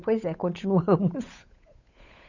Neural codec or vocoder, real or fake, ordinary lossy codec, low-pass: codec, 16 kHz, 8 kbps, FunCodec, trained on Chinese and English, 25 frames a second; fake; none; 7.2 kHz